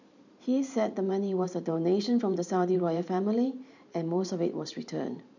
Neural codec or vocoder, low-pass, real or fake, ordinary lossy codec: vocoder, 44.1 kHz, 128 mel bands every 512 samples, BigVGAN v2; 7.2 kHz; fake; none